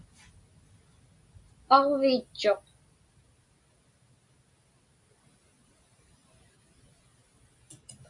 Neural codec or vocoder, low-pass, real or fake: none; 10.8 kHz; real